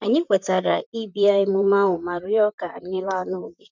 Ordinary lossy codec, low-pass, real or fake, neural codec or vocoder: none; 7.2 kHz; fake; vocoder, 44.1 kHz, 128 mel bands, Pupu-Vocoder